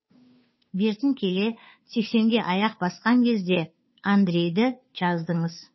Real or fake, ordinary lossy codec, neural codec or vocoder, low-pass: fake; MP3, 24 kbps; codec, 16 kHz, 2 kbps, FunCodec, trained on Chinese and English, 25 frames a second; 7.2 kHz